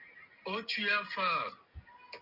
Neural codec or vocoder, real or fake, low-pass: none; real; 5.4 kHz